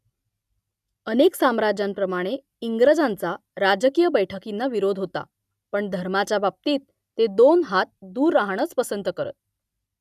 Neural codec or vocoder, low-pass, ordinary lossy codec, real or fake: none; 14.4 kHz; none; real